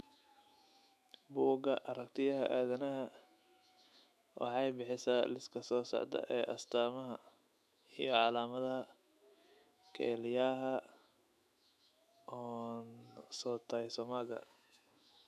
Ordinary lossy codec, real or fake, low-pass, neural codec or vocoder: none; fake; 14.4 kHz; autoencoder, 48 kHz, 128 numbers a frame, DAC-VAE, trained on Japanese speech